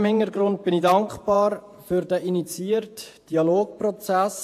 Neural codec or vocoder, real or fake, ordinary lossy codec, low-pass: vocoder, 48 kHz, 128 mel bands, Vocos; fake; AAC, 64 kbps; 14.4 kHz